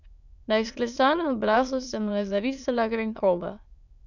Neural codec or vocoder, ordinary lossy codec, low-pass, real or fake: autoencoder, 22.05 kHz, a latent of 192 numbers a frame, VITS, trained on many speakers; none; 7.2 kHz; fake